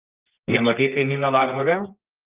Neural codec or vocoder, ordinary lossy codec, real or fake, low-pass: codec, 24 kHz, 0.9 kbps, WavTokenizer, medium music audio release; Opus, 24 kbps; fake; 3.6 kHz